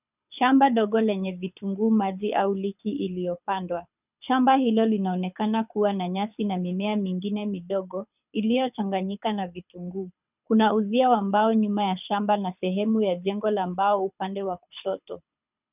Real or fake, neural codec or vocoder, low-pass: fake; codec, 24 kHz, 6 kbps, HILCodec; 3.6 kHz